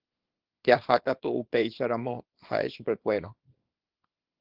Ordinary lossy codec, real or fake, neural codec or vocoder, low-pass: Opus, 16 kbps; fake; codec, 24 kHz, 0.9 kbps, WavTokenizer, small release; 5.4 kHz